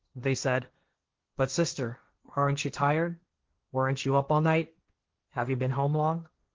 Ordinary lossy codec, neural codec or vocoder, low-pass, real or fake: Opus, 16 kbps; codec, 16 kHz, 1.1 kbps, Voila-Tokenizer; 7.2 kHz; fake